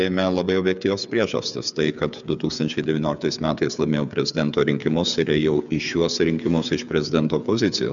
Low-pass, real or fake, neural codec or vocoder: 7.2 kHz; fake; codec, 16 kHz, 8 kbps, FreqCodec, smaller model